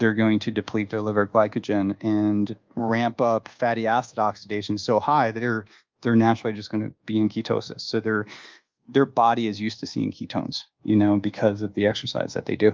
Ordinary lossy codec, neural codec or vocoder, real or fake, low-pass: Opus, 24 kbps; codec, 24 kHz, 1.2 kbps, DualCodec; fake; 7.2 kHz